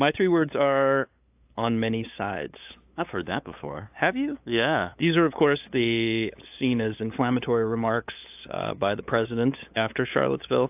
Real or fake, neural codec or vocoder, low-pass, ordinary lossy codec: fake; codec, 16 kHz, 8 kbps, FunCodec, trained on LibriTTS, 25 frames a second; 3.6 kHz; AAC, 32 kbps